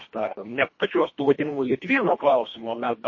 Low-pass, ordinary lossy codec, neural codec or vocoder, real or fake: 7.2 kHz; MP3, 32 kbps; codec, 24 kHz, 1.5 kbps, HILCodec; fake